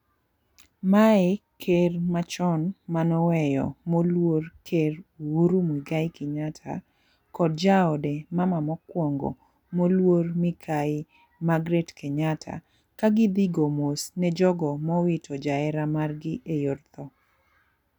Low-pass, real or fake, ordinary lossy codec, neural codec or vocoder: 19.8 kHz; real; none; none